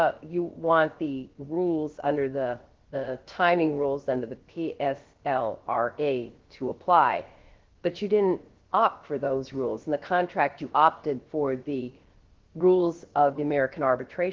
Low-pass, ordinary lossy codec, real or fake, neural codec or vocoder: 7.2 kHz; Opus, 16 kbps; fake; codec, 16 kHz, about 1 kbps, DyCAST, with the encoder's durations